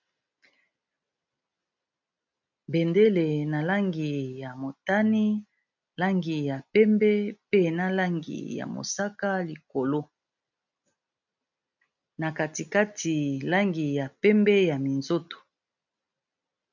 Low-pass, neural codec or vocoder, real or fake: 7.2 kHz; none; real